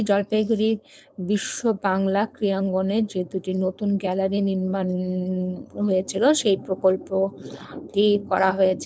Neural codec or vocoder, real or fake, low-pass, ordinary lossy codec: codec, 16 kHz, 4.8 kbps, FACodec; fake; none; none